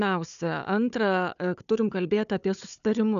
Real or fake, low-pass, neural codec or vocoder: fake; 7.2 kHz; codec, 16 kHz, 4 kbps, FunCodec, trained on Chinese and English, 50 frames a second